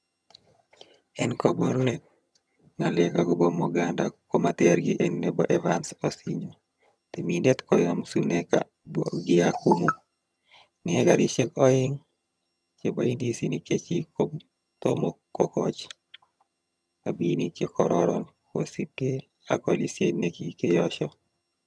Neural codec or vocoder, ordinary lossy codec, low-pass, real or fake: vocoder, 22.05 kHz, 80 mel bands, HiFi-GAN; none; none; fake